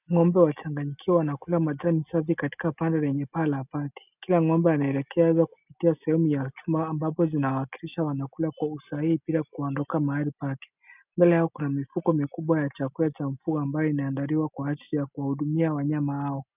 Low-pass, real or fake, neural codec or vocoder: 3.6 kHz; real; none